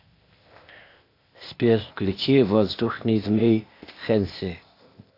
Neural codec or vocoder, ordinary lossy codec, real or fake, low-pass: codec, 16 kHz, 0.8 kbps, ZipCodec; AAC, 32 kbps; fake; 5.4 kHz